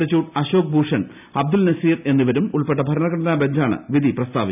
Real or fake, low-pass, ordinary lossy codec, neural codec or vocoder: real; 3.6 kHz; none; none